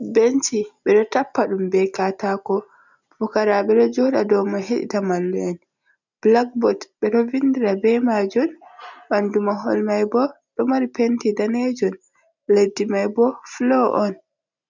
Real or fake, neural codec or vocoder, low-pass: real; none; 7.2 kHz